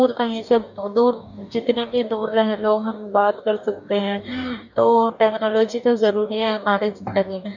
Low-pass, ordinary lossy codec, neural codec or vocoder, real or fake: 7.2 kHz; none; codec, 44.1 kHz, 2.6 kbps, DAC; fake